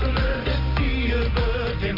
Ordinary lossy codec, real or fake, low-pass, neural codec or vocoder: none; real; 5.4 kHz; none